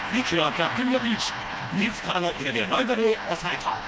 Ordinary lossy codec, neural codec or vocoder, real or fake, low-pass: none; codec, 16 kHz, 1 kbps, FreqCodec, smaller model; fake; none